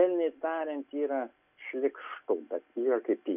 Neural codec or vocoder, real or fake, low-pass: none; real; 3.6 kHz